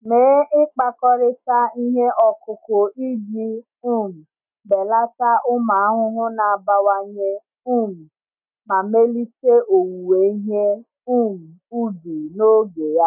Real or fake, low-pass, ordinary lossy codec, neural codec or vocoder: real; 3.6 kHz; none; none